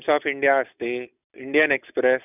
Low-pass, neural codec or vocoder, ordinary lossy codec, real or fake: 3.6 kHz; none; none; real